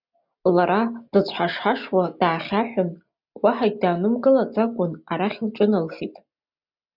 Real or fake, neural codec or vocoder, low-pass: real; none; 5.4 kHz